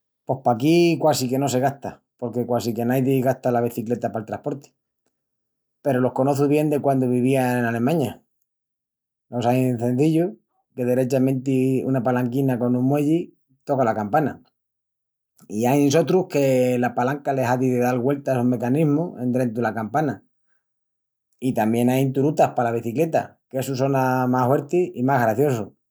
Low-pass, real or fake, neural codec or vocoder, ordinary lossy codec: none; real; none; none